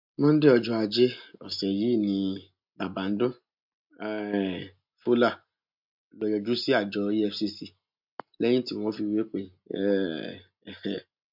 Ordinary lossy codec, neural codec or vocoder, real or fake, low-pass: MP3, 48 kbps; none; real; 5.4 kHz